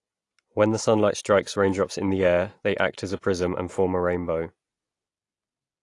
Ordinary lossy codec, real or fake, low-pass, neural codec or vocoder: AAC, 48 kbps; real; 10.8 kHz; none